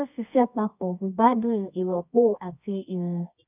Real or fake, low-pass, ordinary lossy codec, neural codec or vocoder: fake; 3.6 kHz; none; codec, 24 kHz, 0.9 kbps, WavTokenizer, medium music audio release